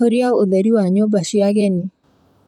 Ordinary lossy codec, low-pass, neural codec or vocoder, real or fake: none; 19.8 kHz; vocoder, 44.1 kHz, 128 mel bands, Pupu-Vocoder; fake